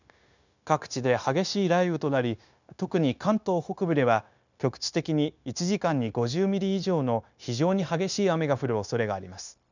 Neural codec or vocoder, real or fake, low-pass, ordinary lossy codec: codec, 16 kHz, 0.9 kbps, LongCat-Audio-Codec; fake; 7.2 kHz; none